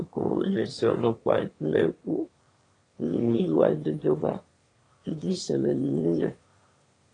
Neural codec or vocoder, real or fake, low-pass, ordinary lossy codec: autoencoder, 22.05 kHz, a latent of 192 numbers a frame, VITS, trained on one speaker; fake; 9.9 kHz; AAC, 32 kbps